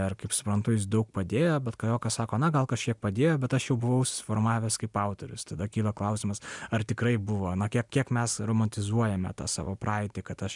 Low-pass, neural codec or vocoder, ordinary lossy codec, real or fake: 10.8 kHz; none; MP3, 96 kbps; real